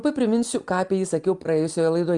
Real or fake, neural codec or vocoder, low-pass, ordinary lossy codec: real; none; 10.8 kHz; Opus, 32 kbps